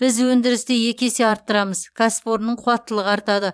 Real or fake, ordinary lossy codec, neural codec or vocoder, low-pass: fake; none; vocoder, 22.05 kHz, 80 mel bands, Vocos; none